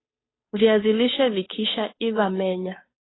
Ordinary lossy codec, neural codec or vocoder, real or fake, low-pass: AAC, 16 kbps; codec, 16 kHz, 2 kbps, FunCodec, trained on Chinese and English, 25 frames a second; fake; 7.2 kHz